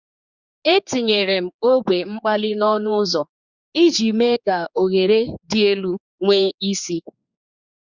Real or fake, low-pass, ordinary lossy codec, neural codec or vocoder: fake; 7.2 kHz; Opus, 64 kbps; codec, 16 kHz, 4 kbps, X-Codec, HuBERT features, trained on general audio